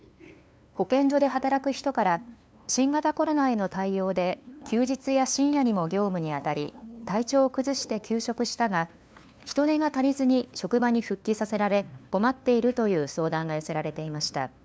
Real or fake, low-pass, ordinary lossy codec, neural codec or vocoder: fake; none; none; codec, 16 kHz, 2 kbps, FunCodec, trained on LibriTTS, 25 frames a second